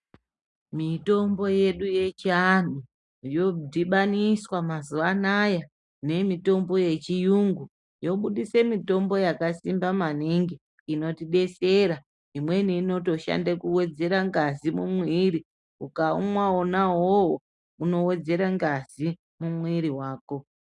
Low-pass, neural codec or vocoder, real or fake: 10.8 kHz; none; real